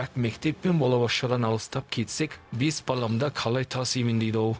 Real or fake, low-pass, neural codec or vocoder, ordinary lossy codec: fake; none; codec, 16 kHz, 0.4 kbps, LongCat-Audio-Codec; none